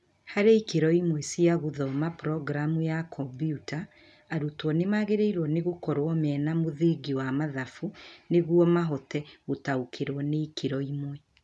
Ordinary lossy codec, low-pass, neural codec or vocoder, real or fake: none; none; none; real